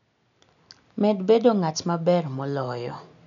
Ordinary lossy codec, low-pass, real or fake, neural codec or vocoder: none; 7.2 kHz; real; none